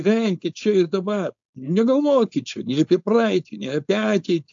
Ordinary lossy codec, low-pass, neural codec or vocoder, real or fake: AAC, 64 kbps; 7.2 kHz; codec, 16 kHz, 4.8 kbps, FACodec; fake